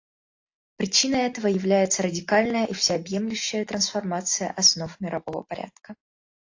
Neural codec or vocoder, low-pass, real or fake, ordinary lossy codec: none; 7.2 kHz; real; AAC, 48 kbps